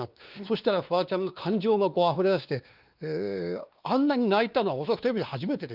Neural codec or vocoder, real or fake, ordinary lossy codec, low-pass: codec, 16 kHz, 2 kbps, X-Codec, WavLM features, trained on Multilingual LibriSpeech; fake; Opus, 24 kbps; 5.4 kHz